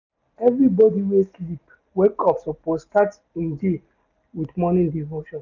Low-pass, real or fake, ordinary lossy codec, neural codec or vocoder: 7.2 kHz; real; none; none